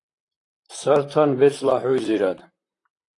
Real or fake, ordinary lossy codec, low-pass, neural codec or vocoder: fake; AAC, 48 kbps; 10.8 kHz; vocoder, 44.1 kHz, 128 mel bands, Pupu-Vocoder